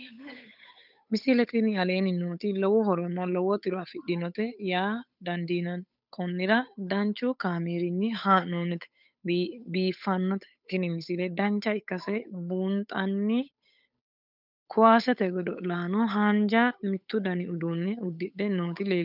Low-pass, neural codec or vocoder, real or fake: 5.4 kHz; codec, 16 kHz, 8 kbps, FunCodec, trained on Chinese and English, 25 frames a second; fake